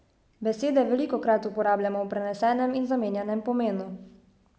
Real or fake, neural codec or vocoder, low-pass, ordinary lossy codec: real; none; none; none